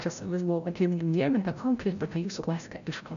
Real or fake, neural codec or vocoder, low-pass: fake; codec, 16 kHz, 0.5 kbps, FreqCodec, larger model; 7.2 kHz